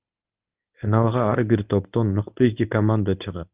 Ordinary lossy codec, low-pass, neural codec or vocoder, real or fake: Opus, 24 kbps; 3.6 kHz; codec, 24 kHz, 0.9 kbps, WavTokenizer, medium speech release version 1; fake